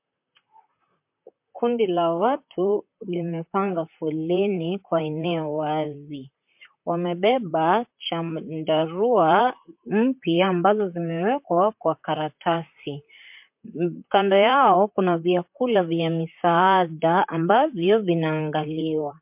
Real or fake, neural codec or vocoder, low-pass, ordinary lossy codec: fake; vocoder, 44.1 kHz, 128 mel bands, Pupu-Vocoder; 3.6 kHz; MP3, 32 kbps